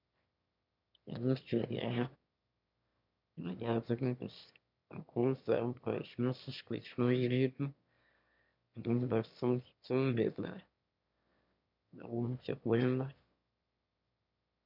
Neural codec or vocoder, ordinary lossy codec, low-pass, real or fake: autoencoder, 22.05 kHz, a latent of 192 numbers a frame, VITS, trained on one speaker; MP3, 48 kbps; 5.4 kHz; fake